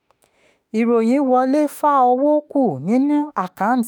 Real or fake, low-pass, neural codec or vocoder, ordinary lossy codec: fake; none; autoencoder, 48 kHz, 32 numbers a frame, DAC-VAE, trained on Japanese speech; none